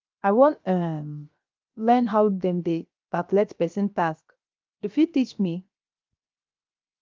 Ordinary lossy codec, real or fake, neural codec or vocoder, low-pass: Opus, 24 kbps; fake; codec, 16 kHz, 0.7 kbps, FocalCodec; 7.2 kHz